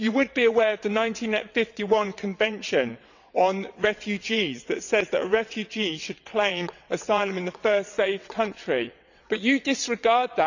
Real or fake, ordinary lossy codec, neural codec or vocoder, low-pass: fake; none; vocoder, 22.05 kHz, 80 mel bands, WaveNeXt; 7.2 kHz